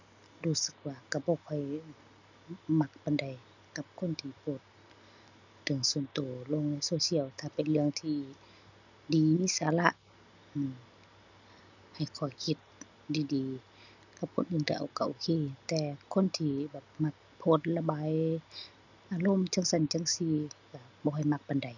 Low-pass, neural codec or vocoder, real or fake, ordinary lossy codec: 7.2 kHz; none; real; none